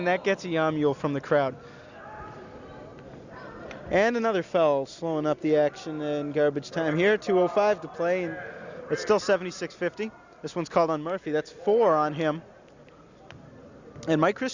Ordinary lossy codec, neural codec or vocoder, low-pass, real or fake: Opus, 64 kbps; none; 7.2 kHz; real